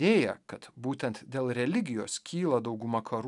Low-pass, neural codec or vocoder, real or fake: 10.8 kHz; none; real